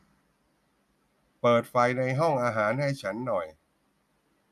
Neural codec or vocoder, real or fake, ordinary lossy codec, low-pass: none; real; none; 14.4 kHz